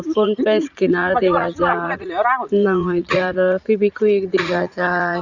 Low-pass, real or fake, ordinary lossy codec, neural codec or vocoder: 7.2 kHz; fake; Opus, 64 kbps; vocoder, 44.1 kHz, 80 mel bands, Vocos